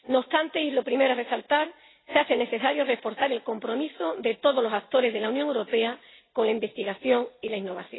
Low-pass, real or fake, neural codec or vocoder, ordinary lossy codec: 7.2 kHz; real; none; AAC, 16 kbps